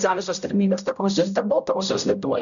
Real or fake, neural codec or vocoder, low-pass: fake; codec, 16 kHz, 0.5 kbps, X-Codec, HuBERT features, trained on general audio; 7.2 kHz